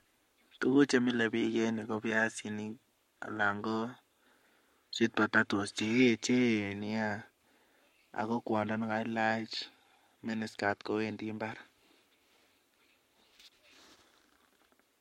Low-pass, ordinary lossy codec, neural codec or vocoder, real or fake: 19.8 kHz; MP3, 64 kbps; codec, 44.1 kHz, 7.8 kbps, Pupu-Codec; fake